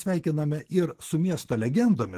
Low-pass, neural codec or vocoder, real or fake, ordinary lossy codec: 14.4 kHz; autoencoder, 48 kHz, 128 numbers a frame, DAC-VAE, trained on Japanese speech; fake; Opus, 16 kbps